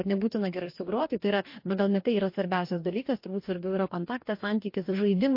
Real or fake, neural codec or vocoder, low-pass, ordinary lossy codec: fake; codec, 44.1 kHz, 2.6 kbps, DAC; 5.4 kHz; MP3, 32 kbps